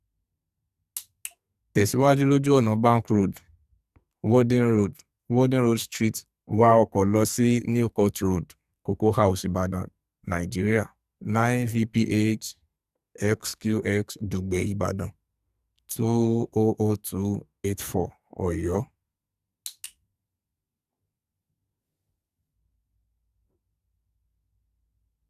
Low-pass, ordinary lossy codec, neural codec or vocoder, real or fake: 14.4 kHz; Opus, 64 kbps; codec, 44.1 kHz, 2.6 kbps, SNAC; fake